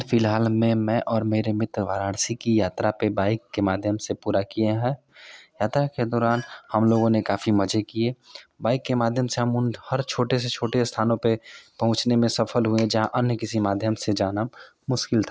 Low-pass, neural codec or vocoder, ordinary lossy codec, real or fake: none; none; none; real